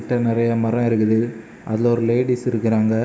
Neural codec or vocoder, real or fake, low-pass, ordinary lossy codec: none; real; none; none